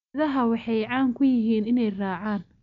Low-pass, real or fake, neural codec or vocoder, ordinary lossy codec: 7.2 kHz; real; none; none